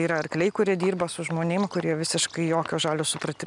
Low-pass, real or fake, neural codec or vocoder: 10.8 kHz; real; none